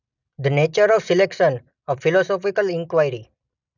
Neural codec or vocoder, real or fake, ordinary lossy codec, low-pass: none; real; none; 7.2 kHz